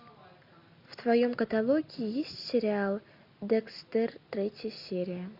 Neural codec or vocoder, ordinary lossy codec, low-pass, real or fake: none; MP3, 48 kbps; 5.4 kHz; real